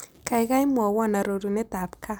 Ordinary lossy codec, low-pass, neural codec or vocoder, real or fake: none; none; none; real